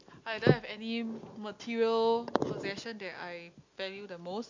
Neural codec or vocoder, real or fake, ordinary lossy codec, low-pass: none; real; MP3, 48 kbps; 7.2 kHz